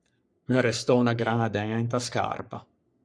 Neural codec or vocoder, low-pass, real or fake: codec, 44.1 kHz, 3.4 kbps, Pupu-Codec; 9.9 kHz; fake